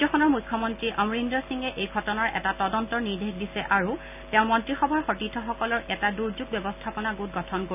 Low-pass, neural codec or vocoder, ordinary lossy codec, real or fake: 3.6 kHz; none; none; real